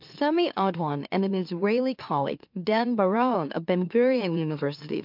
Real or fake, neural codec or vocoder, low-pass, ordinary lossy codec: fake; autoencoder, 44.1 kHz, a latent of 192 numbers a frame, MeloTTS; 5.4 kHz; MP3, 48 kbps